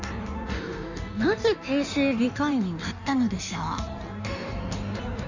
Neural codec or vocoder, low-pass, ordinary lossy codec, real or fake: codec, 16 kHz in and 24 kHz out, 1.1 kbps, FireRedTTS-2 codec; 7.2 kHz; none; fake